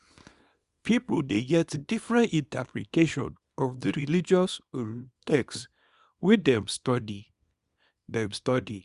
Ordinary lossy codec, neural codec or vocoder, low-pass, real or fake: Opus, 64 kbps; codec, 24 kHz, 0.9 kbps, WavTokenizer, small release; 10.8 kHz; fake